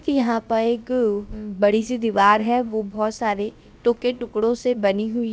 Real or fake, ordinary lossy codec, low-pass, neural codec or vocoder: fake; none; none; codec, 16 kHz, about 1 kbps, DyCAST, with the encoder's durations